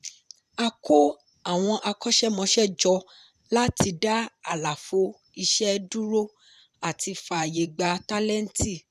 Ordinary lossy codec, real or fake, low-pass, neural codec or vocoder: none; fake; 14.4 kHz; vocoder, 44.1 kHz, 128 mel bands every 512 samples, BigVGAN v2